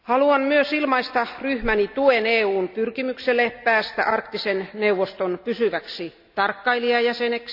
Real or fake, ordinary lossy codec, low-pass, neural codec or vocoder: real; AAC, 48 kbps; 5.4 kHz; none